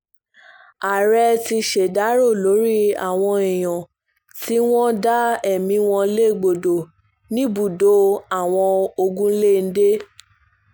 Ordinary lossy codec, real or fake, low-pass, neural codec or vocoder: none; real; none; none